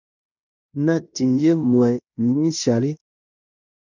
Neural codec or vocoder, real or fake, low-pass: codec, 16 kHz in and 24 kHz out, 0.9 kbps, LongCat-Audio-Codec, fine tuned four codebook decoder; fake; 7.2 kHz